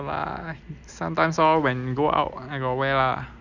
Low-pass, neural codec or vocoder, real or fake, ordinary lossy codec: 7.2 kHz; none; real; none